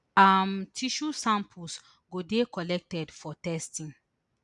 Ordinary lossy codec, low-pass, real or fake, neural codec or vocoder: none; 10.8 kHz; real; none